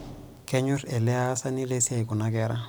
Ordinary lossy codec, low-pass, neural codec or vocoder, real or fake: none; none; vocoder, 44.1 kHz, 128 mel bands every 256 samples, BigVGAN v2; fake